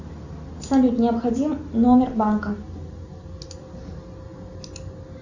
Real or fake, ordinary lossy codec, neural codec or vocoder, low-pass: real; Opus, 64 kbps; none; 7.2 kHz